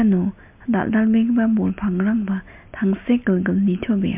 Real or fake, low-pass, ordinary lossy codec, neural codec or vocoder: real; 3.6 kHz; MP3, 32 kbps; none